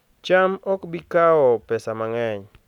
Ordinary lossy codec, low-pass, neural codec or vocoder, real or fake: none; 19.8 kHz; none; real